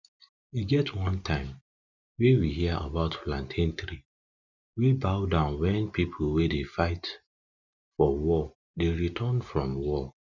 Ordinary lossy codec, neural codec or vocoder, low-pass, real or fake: none; none; 7.2 kHz; real